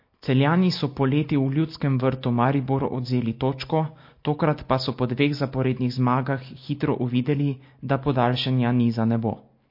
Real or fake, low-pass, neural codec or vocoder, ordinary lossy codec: real; 5.4 kHz; none; MP3, 32 kbps